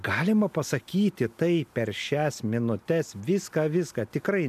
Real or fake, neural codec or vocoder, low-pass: real; none; 14.4 kHz